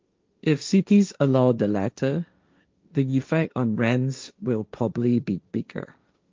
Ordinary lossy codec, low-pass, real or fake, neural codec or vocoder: Opus, 24 kbps; 7.2 kHz; fake; codec, 16 kHz, 1.1 kbps, Voila-Tokenizer